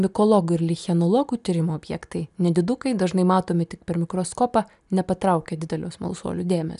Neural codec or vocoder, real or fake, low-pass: none; real; 10.8 kHz